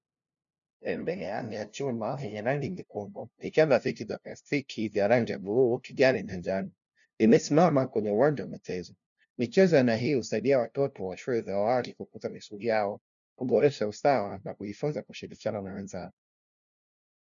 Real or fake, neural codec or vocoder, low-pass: fake; codec, 16 kHz, 0.5 kbps, FunCodec, trained on LibriTTS, 25 frames a second; 7.2 kHz